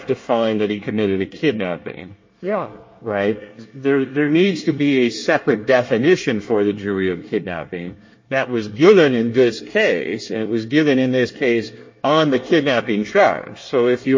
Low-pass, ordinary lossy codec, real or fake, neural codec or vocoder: 7.2 kHz; MP3, 32 kbps; fake; codec, 24 kHz, 1 kbps, SNAC